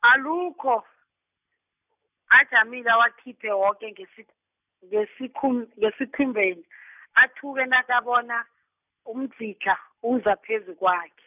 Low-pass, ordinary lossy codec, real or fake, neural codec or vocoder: 3.6 kHz; none; real; none